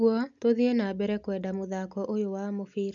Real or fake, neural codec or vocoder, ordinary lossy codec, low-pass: real; none; none; 7.2 kHz